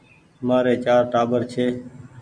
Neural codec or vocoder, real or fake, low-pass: none; real; 9.9 kHz